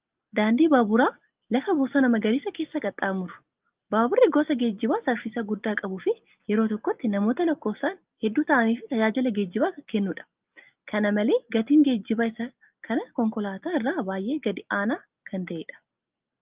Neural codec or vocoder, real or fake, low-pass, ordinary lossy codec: none; real; 3.6 kHz; Opus, 32 kbps